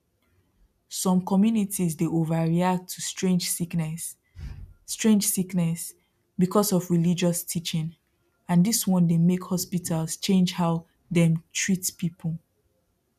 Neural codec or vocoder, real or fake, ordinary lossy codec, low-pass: none; real; none; 14.4 kHz